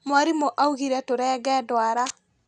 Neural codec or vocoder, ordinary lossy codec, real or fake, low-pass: none; none; real; 10.8 kHz